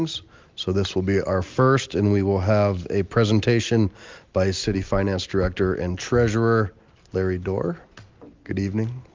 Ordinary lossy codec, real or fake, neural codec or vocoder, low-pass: Opus, 32 kbps; real; none; 7.2 kHz